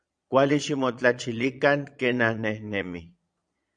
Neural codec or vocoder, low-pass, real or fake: vocoder, 22.05 kHz, 80 mel bands, Vocos; 9.9 kHz; fake